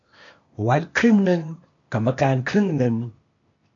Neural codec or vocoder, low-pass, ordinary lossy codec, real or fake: codec, 16 kHz, 0.8 kbps, ZipCodec; 7.2 kHz; MP3, 48 kbps; fake